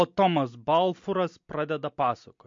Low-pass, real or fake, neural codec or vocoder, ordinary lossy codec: 7.2 kHz; real; none; MP3, 48 kbps